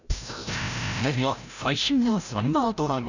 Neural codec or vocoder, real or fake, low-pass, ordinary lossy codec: codec, 16 kHz, 0.5 kbps, FreqCodec, larger model; fake; 7.2 kHz; none